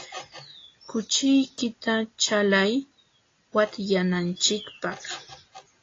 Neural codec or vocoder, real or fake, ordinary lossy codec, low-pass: none; real; AAC, 32 kbps; 7.2 kHz